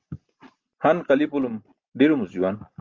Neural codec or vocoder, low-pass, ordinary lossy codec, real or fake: none; 7.2 kHz; Opus, 32 kbps; real